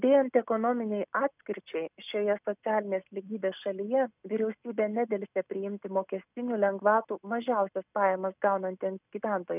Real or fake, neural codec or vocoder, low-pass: real; none; 3.6 kHz